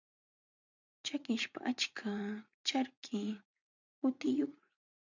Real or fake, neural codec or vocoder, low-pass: fake; vocoder, 44.1 kHz, 80 mel bands, Vocos; 7.2 kHz